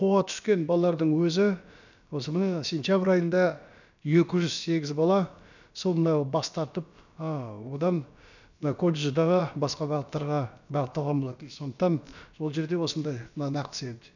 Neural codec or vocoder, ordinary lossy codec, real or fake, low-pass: codec, 16 kHz, about 1 kbps, DyCAST, with the encoder's durations; none; fake; 7.2 kHz